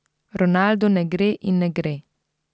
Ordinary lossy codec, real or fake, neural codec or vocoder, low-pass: none; real; none; none